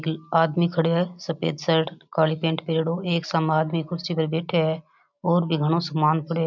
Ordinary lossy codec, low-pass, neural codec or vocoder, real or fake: none; 7.2 kHz; none; real